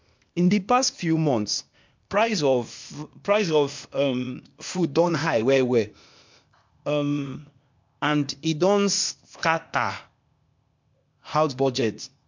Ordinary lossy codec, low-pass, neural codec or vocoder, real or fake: MP3, 64 kbps; 7.2 kHz; codec, 16 kHz, 0.8 kbps, ZipCodec; fake